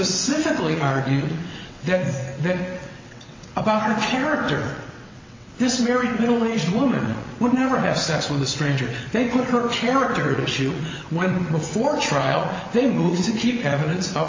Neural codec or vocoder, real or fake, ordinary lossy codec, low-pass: vocoder, 22.05 kHz, 80 mel bands, WaveNeXt; fake; MP3, 32 kbps; 7.2 kHz